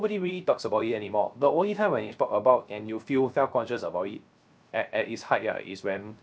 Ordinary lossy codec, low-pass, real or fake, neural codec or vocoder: none; none; fake; codec, 16 kHz, 0.3 kbps, FocalCodec